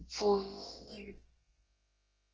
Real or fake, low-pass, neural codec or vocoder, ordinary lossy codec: fake; 7.2 kHz; codec, 16 kHz, about 1 kbps, DyCAST, with the encoder's durations; Opus, 16 kbps